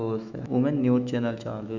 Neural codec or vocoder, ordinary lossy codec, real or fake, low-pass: autoencoder, 48 kHz, 128 numbers a frame, DAC-VAE, trained on Japanese speech; none; fake; 7.2 kHz